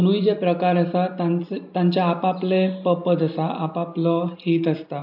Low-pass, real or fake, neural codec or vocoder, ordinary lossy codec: 5.4 kHz; real; none; AAC, 48 kbps